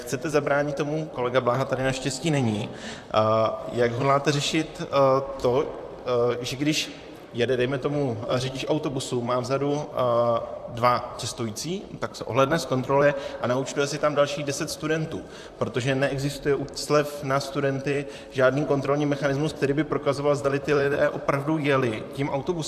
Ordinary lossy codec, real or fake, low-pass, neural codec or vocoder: AAC, 96 kbps; fake; 14.4 kHz; vocoder, 44.1 kHz, 128 mel bands, Pupu-Vocoder